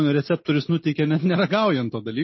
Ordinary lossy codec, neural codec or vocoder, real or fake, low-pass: MP3, 24 kbps; none; real; 7.2 kHz